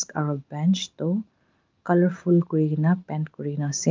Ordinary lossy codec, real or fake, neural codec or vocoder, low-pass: Opus, 24 kbps; real; none; 7.2 kHz